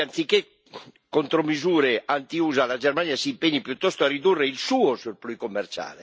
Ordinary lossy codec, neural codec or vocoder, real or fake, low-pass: none; none; real; none